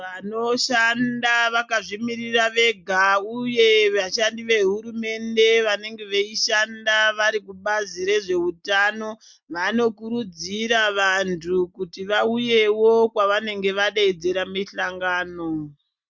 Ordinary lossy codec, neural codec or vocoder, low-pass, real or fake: MP3, 64 kbps; none; 7.2 kHz; real